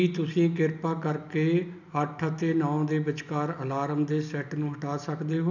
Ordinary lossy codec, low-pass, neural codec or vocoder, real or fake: none; 7.2 kHz; none; real